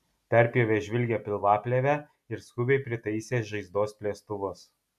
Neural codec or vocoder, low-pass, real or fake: none; 14.4 kHz; real